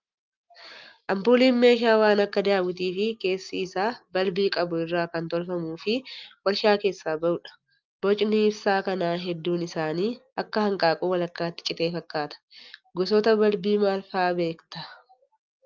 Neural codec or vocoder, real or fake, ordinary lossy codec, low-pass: none; real; Opus, 24 kbps; 7.2 kHz